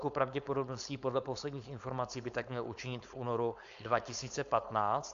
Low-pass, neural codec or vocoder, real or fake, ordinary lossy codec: 7.2 kHz; codec, 16 kHz, 4.8 kbps, FACodec; fake; MP3, 64 kbps